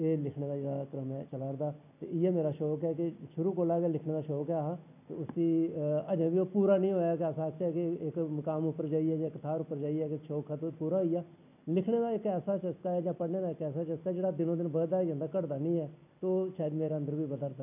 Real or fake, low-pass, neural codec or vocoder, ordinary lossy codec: real; 3.6 kHz; none; none